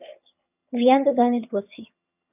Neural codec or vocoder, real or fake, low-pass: vocoder, 22.05 kHz, 80 mel bands, HiFi-GAN; fake; 3.6 kHz